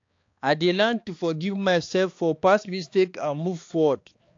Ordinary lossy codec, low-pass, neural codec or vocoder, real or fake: AAC, 48 kbps; 7.2 kHz; codec, 16 kHz, 2 kbps, X-Codec, HuBERT features, trained on balanced general audio; fake